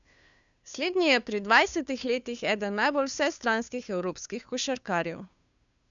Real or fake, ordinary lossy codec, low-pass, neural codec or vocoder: fake; none; 7.2 kHz; codec, 16 kHz, 2 kbps, FunCodec, trained on Chinese and English, 25 frames a second